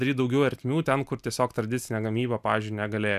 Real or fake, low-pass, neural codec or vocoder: real; 14.4 kHz; none